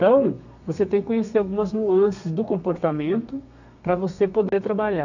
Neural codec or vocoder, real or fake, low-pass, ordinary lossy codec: codec, 32 kHz, 1.9 kbps, SNAC; fake; 7.2 kHz; none